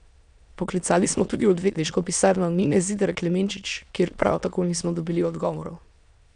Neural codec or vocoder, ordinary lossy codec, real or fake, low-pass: autoencoder, 22.05 kHz, a latent of 192 numbers a frame, VITS, trained on many speakers; none; fake; 9.9 kHz